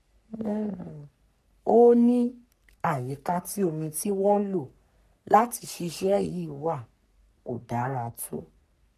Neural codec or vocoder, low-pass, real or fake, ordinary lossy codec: codec, 44.1 kHz, 3.4 kbps, Pupu-Codec; 14.4 kHz; fake; none